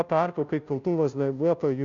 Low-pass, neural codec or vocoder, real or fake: 7.2 kHz; codec, 16 kHz, 0.5 kbps, FunCodec, trained on Chinese and English, 25 frames a second; fake